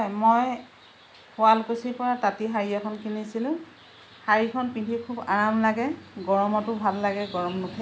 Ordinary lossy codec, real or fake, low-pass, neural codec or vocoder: none; real; none; none